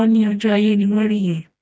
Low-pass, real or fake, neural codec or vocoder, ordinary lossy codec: none; fake; codec, 16 kHz, 1 kbps, FreqCodec, smaller model; none